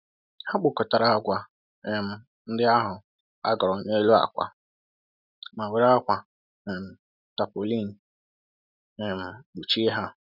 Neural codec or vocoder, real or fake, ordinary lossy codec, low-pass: none; real; none; 5.4 kHz